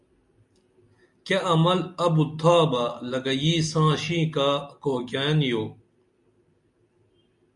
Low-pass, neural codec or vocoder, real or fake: 10.8 kHz; none; real